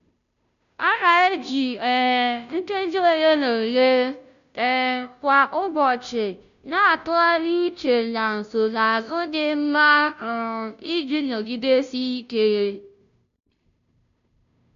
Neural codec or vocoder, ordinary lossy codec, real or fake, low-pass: codec, 16 kHz, 0.5 kbps, FunCodec, trained on Chinese and English, 25 frames a second; none; fake; 7.2 kHz